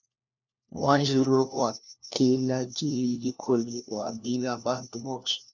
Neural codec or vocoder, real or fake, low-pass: codec, 16 kHz, 1 kbps, FunCodec, trained on LibriTTS, 50 frames a second; fake; 7.2 kHz